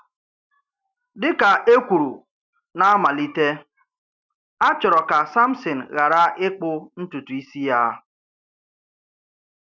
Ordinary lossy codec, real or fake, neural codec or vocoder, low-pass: none; real; none; 7.2 kHz